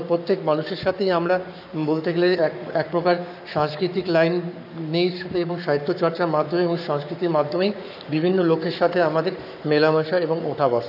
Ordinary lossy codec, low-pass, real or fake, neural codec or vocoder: none; 5.4 kHz; fake; codec, 44.1 kHz, 7.8 kbps, Pupu-Codec